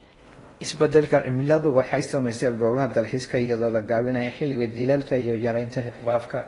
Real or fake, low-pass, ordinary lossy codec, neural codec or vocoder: fake; 10.8 kHz; AAC, 32 kbps; codec, 16 kHz in and 24 kHz out, 0.6 kbps, FocalCodec, streaming, 4096 codes